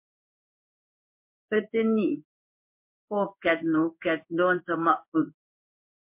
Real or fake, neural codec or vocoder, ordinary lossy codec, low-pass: fake; codec, 16 kHz in and 24 kHz out, 1 kbps, XY-Tokenizer; MP3, 32 kbps; 3.6 kHz